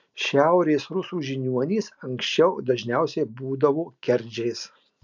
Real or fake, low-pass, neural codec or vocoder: real; 7.2 kHz; none